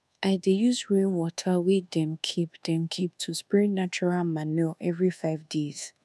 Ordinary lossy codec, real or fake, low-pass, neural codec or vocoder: none; fake; none; codec, 24 kHz, 1.2 kbps, DualCodec